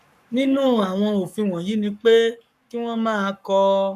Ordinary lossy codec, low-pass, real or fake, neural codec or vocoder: none; 14.4 kHz; fake; codec, 44.1 kHz, 7.8 kbps, Pupu-Codec